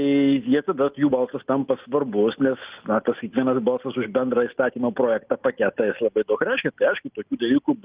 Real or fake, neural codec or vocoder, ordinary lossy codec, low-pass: real; none; Opus, 32 kbps; 3.6 kHz